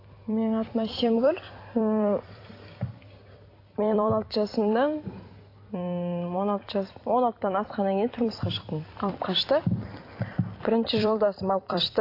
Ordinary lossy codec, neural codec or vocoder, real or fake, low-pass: AAC, 32 kbps; vocoder, 44.1 kHz, 128 mel bands every 256 samples, BigVGAN v2; fake; 5.4 kHz